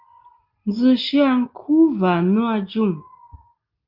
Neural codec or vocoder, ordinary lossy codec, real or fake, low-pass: none; Opus, 32 kbps; real; 5.4 kHz